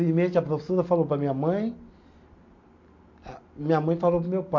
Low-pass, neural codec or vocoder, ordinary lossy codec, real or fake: 7.2 kHz; none; MP3, 48 kbps; real